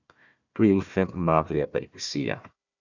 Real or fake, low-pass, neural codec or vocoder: fake; 7.2 kHz; codec, 16 kHz, 1 kbps, FunCodec, trained on Chinese and English, 50 frames a second